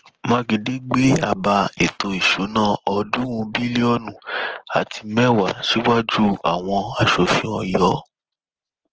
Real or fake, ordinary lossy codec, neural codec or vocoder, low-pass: real; Opus, 16 kbps; none; 7.2 kHz